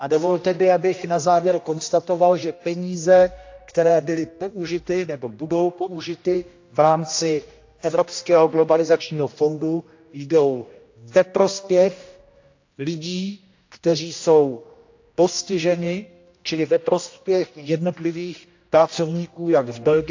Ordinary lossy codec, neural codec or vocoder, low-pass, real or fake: AAC, 48 kbps; codec, 16 kHz, 1 kbps, X-Codec, HuBERT features, trained on general audio; 7.2 kHz; fake